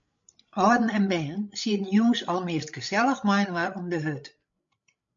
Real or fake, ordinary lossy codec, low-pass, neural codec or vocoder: fake; MP3, 48 kbps; 7.2 kHz; codec, 16 kHz, 16 kbps, FreqCodec, larger model